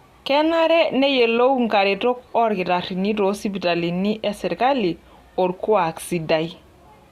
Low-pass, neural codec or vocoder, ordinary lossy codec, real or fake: 14.4 kHz; none; Opus, 64 kbps; real